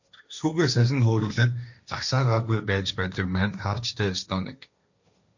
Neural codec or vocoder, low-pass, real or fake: codec, 16 kHz, 1.1 kbps, Voila-Tokenizer; 7.2 kHz; fake